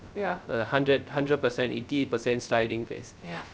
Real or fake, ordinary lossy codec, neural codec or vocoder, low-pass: fake; none; codec, 16 kHz, 0.3 kbps, FocalCodec; none